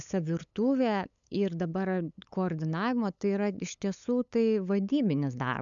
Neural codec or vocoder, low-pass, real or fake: codec, 16 kHz, 8 kbps, FunCodec, trained on LibriTTS, 25 frames a second; 7.2 kHz; fake